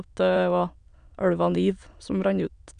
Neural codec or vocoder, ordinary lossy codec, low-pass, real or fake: autoencoder, 22.05 kHz, a latent of 192 numbers a frame, VITS, trained on many speakers; none; 9.9 kHz; fake